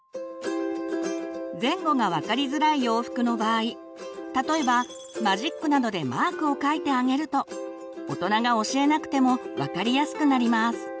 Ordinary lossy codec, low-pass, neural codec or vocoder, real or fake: none; none; none; real